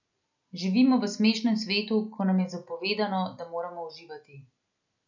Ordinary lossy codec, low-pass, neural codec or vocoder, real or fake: none; 7.2 kHz; none; real